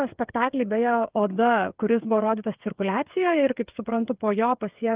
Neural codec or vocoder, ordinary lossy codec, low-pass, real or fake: codec, 16 kHz, 8 kbps, FreqCodec, smaller model; Opus, 32 kbps; 3.6 kHz; fake